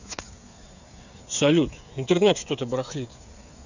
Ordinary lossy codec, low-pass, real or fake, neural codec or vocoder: none; 7.2 kHz; fake; codec, 16 kHz, 8 kbps, FreqCodec, smaller model